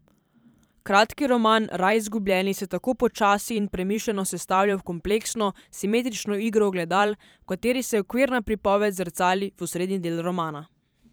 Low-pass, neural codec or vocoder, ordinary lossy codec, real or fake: none; vocoder, 44.1 kHz, 128 mel bands every 512 samples, BigVGAN v2; none; fake